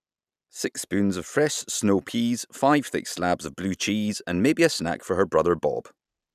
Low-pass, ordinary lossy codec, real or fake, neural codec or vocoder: 14.4 kHz; none; real; none